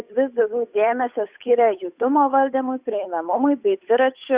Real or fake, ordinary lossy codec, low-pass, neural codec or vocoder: fake; Opus, 64 kbps; 3.6 kHz; codec, 16 kHz, 8 kbps, FunCodec, trained on Chinese and English, 25 frames a second